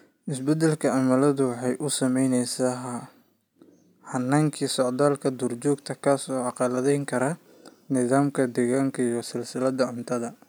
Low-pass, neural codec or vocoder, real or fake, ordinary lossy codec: none; none; real; none